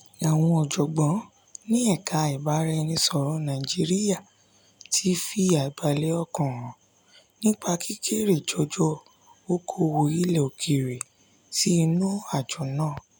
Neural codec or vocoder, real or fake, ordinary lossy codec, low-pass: none; real; none; none